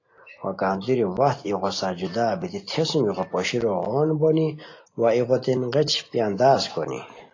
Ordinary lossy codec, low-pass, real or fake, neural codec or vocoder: AAC, 32 kbps; 7.2 kHz; real; none